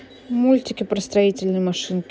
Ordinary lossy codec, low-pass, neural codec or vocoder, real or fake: none; none; none; real